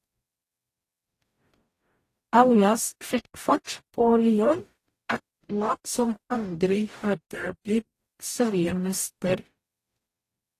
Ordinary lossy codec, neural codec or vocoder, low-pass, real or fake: AAC, 48 kbps; codec, 44.1 kHz, 0.9 kbps, DAC; 14.4 kHz; fake